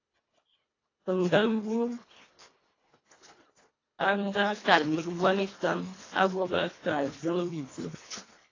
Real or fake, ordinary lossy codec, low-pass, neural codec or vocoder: fake; AAC, 32 kbps; 7.2 kHz; codec, 24 kHz, 1.5 kbps, HILCodec